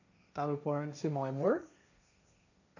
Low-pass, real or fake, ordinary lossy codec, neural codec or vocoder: 7.2 kHz; fake; none; codec, 16 kHz, 1.1 kbps, Voila-Tokenizer